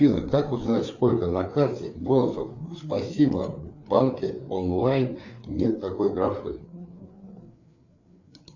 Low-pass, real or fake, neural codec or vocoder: 7.2 kHz; fake; codec, 16 kHz, 4 kbps, FreqCodec, larger model